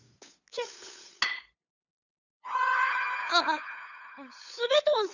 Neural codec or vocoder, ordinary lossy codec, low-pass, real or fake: codec, 16 kHz, 8 kbps, FunCodec, trained on LibriTTS, 25 frames a second; none; 7.2 kHz; fake